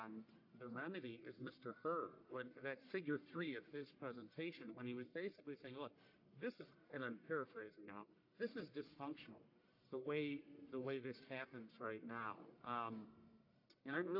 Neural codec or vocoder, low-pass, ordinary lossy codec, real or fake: codec, 44.1 kHz, 1.7 kbps, Pupu-Codec; 5.4 kHz; AAC, 48 kbps; fake